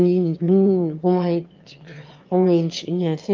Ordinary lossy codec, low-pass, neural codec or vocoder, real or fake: Opus, 24 kbps; 7.2 kHz; autoencoder, 22.05 kHz, a latent of 192 numbers a frame, VITS, trained on one speaker; fake